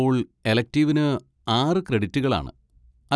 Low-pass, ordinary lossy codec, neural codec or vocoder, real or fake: none; none; none; real